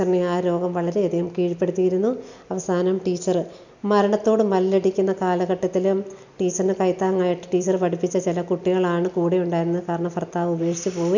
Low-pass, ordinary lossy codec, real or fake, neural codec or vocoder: 7.2 kHz; none; real; none